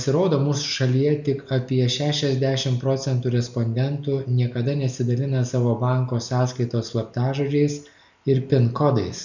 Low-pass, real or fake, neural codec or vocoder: 7.2 kHz; real; none